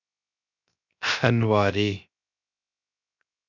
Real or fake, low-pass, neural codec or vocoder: fake; 7.2 kHz; codec, 16 kHz, 0.3 kbps, FocalCodec